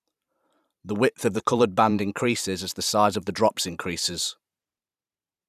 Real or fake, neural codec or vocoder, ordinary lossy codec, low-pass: fake; vocoder, 44.1 kHz, 128 mel bands every 512 samples, BigVGAN v2; none; 14.4 kHz